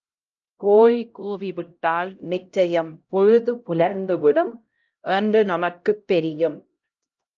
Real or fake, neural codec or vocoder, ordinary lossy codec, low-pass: fake; codec, 16 kHz, 0.5 kbps, X-Codec, HuBERT features, trained on LibriSpeech; Opus, 24 kbps; 7.2 kHz